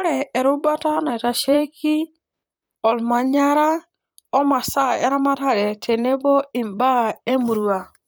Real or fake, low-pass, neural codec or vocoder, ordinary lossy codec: fake; none; vocoder, 44.1 kHz, 128 mel bands, Pupu-Vocoder; none